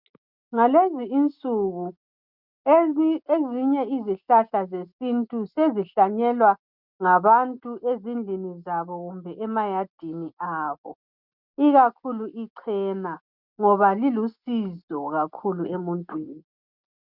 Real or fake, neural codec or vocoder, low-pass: real; none; 5.4 kHz